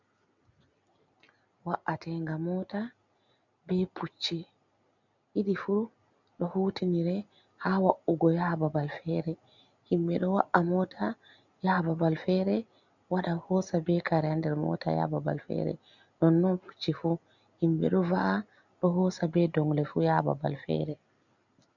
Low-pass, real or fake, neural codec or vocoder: 7.2 kHz; real; none